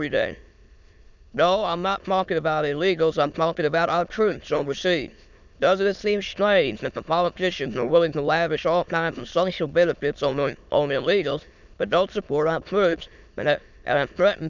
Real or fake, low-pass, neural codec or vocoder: fake; 7.2 kHz; autoencoder, 22.05 kHz, a latent of 192 numbers a frame, VITS, trained on many speakers